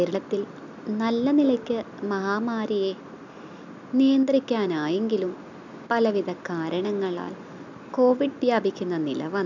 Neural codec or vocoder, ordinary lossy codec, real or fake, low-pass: none; none; real; 7.2 kHz